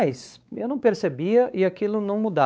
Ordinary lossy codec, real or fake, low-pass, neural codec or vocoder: none; fake; none; codec, 16 kHz, 4 kbps, X-Codec, WavLM features, trained on Multilingual LibriSpeech